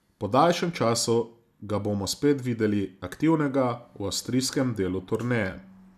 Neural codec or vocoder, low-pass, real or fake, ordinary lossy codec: none; 14.4 kHz; real; none